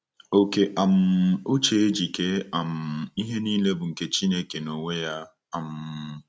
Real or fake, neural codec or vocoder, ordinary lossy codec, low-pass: real; none; none; none